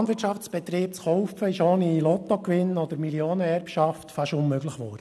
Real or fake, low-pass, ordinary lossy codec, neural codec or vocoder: real; none; none; none